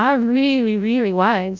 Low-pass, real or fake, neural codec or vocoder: 7.2 kHz; fake; codec, 16 kHz, 0.5 kbps, FreqCodec, larger model